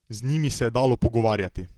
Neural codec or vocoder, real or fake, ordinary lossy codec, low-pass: vocoder, 44.1 kHz, 128 mel bands, Pupu-Vocoder; fake; Opus, 16 kbps; 19.8 kHz